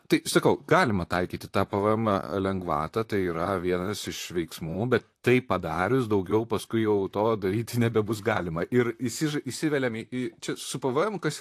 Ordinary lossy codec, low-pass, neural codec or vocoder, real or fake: AAC, 64 kbps; 14.4 kHz; vocoder, 44.1 kHz, 128 mel bands, Pupu-Vocoder; fake